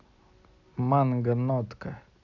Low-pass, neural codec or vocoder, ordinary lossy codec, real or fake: 7.2 kHz; none; none; real